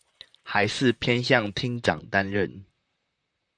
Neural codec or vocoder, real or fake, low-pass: vocoder, 44.1 kHz, 128 mel bands, Pupu-Vocoder; fake; 9.9 kHz